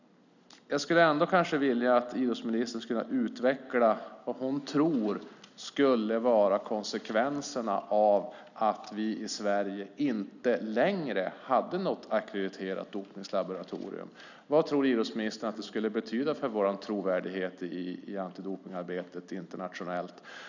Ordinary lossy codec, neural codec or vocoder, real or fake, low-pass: none; none; real; 7.2 kHz